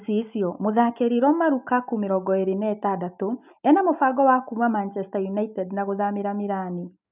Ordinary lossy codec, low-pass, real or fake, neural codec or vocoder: none; 3.6 kHz; real; none